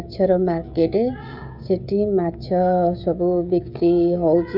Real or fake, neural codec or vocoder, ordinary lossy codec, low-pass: fake; codec, 16 kHz, 16 kbps, FreqCodec, smaller model; none; 5.4 kHz